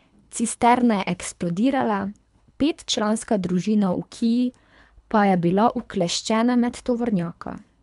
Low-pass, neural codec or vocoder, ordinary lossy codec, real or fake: 10.8 kHz; codec, 24 kHz, 3 kbps, HILCodec; none; fake